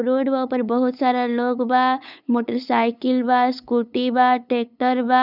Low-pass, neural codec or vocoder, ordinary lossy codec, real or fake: 5.4 kHz; codec, 16 kHz, 8 kbps, FunCodec, trained on LibriTTS, 25 frames a second; none; fake